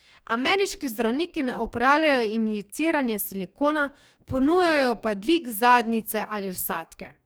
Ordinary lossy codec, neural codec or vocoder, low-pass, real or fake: none; codec, 44.1 kHz, 2.6 kbps, DAC; none; fake